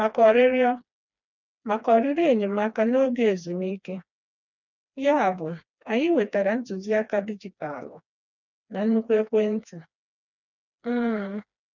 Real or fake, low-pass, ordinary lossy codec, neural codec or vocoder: fake; 7.2 kHz; none; codec, 16 kHz, 2 kbps, FreqCodec, smaller model